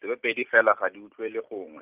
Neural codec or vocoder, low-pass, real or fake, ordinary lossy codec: none; 3.6 kHz; real; Opus, 32 kbps